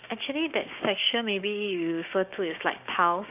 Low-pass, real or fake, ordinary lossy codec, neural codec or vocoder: 3.6 kHz; fake; none; codec, 16 kHz, 6 kbps, DAC